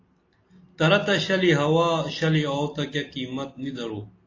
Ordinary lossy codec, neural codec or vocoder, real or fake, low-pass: AAC, 32 kbps; none; real; 7.2 kHz